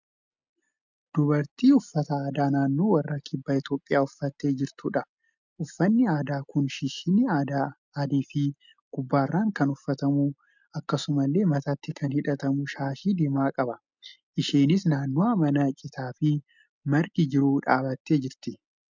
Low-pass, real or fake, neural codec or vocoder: 7.2 kHz; real; none